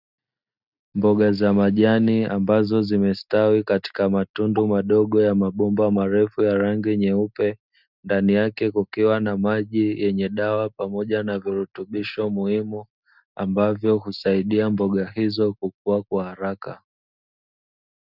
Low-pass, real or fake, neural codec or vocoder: 5.4 kHz; real; none